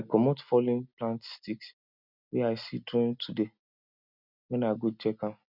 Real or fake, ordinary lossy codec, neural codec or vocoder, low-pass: real; none; none; 5.4 kHz